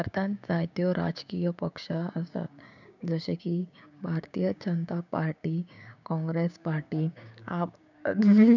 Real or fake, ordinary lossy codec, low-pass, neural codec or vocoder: fake; none; 7.2 kHz; codec, 16 kHz, 6 kbps, DAC